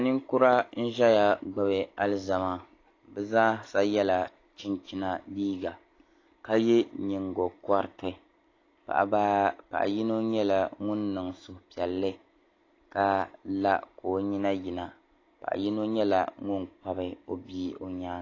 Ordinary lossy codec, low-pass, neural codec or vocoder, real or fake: AAC, 32 kbps; 7.2 kHz; none; real